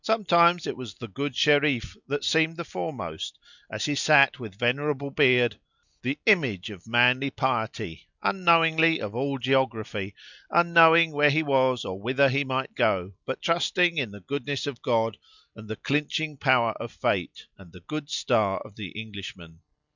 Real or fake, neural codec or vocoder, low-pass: real; none; 7.2 kHz